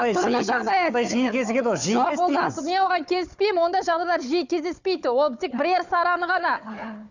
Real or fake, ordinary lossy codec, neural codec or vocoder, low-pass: fake; none; codec, 16 kHz, 4 kbps, FunCodec, trained on Chinese and English, 50 frames a second; 7.2 kHz